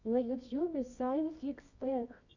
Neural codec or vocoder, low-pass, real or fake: codec, 24 kHz, 0.9 kbps, WavTokenizer, medium music audio release; 7.2 kHz; fake